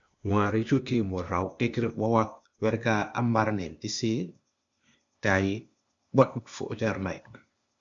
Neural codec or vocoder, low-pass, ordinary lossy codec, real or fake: codec, 16 kHz, 0.8 kbps, ZipCodec; 7.2 kHz; MP3, 64 kbps; fake